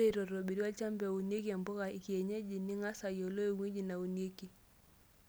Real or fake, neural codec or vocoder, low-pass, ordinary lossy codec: real; none; none; none